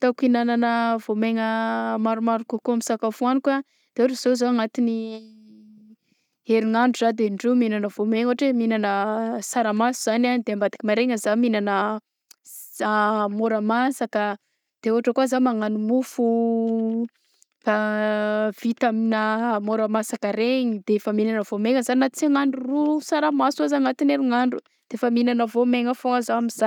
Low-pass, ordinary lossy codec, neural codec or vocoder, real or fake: 19.8 kHz; none; none; real